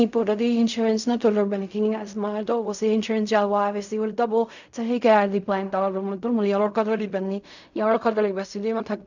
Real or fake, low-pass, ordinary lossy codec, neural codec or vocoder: fake; 7.2 kHz; none; codec, 16 kHz in and 24 kHz out, 0.4 kbps, LongCat-Audio-Codec, fine tuned four codebook decoder